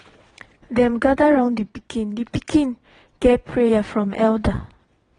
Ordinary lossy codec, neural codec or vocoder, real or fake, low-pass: AAC, 32 kbps; vocoder, 22.05 kHz, 80 mel bands, WaveNeXt; fake; 9.9 kHz